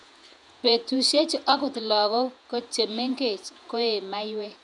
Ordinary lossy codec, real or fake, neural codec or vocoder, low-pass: none; fake; vocoder, 48 kHz, 128 mel bands, Vocos; 10.8 kHz